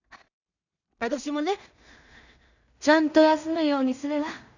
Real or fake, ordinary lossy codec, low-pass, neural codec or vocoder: fake; none; 7.2 kHz; codec, 16 kHz in and 24 kHz out, 0.4 kbps, LongCat-Audio-Codec, two codebook decoder